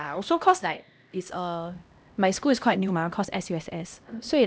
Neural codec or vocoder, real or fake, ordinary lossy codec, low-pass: codec, 16 kHz, 1 kbps, X-Codec, HuBERT features, trained on LibriSpeech; fake; none; none